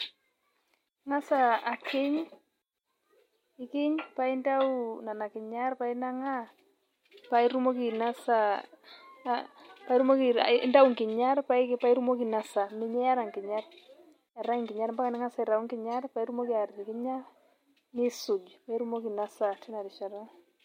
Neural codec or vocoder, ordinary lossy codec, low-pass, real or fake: none; MP3, 64 kbps; 19.8 kHz; real